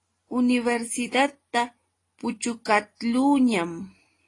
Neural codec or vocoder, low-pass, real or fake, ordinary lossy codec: none; 10.8 kHz; real; AAC, 32 kbps